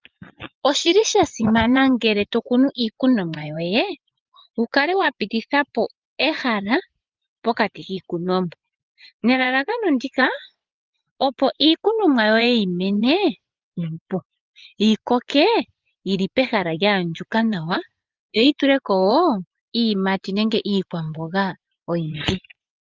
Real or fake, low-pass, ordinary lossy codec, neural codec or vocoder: fake; 7.2 kHz; Opus, 24 kbps; vocoder, 24 kHz, 100 mel bands, Vocos